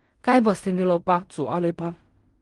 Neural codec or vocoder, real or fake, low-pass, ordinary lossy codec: codec, 16 kHz in and 24 kHz out, 0.4 kbps, LongCat-Audio-Codec, fine tuned four codebook decoder; fake; 10.8 kHz; Opus, 24 kbps